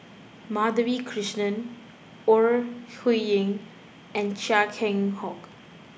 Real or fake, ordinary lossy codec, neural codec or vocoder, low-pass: real; none; none; none